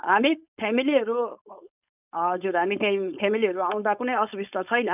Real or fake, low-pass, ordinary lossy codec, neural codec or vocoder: fake; 3.6 kHz; none; codec, 16 kHz, 4.8 kbps, FACodec